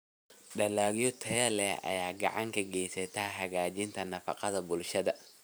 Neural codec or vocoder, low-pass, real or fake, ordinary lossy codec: vocoder, 44.1 kHz, 128 mel bands every 512 samples, BigVGAN v2; none; fake; none